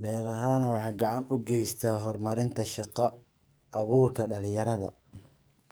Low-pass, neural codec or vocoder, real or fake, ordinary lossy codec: none; codec, 44.1 kHz, 2.6 kbps, SNAC; fake; none